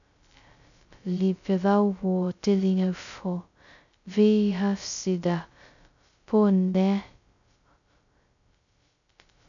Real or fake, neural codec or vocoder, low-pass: fake; codec, 16 kHz, 0.2 kbps, FocalCodec; 7.2 kHz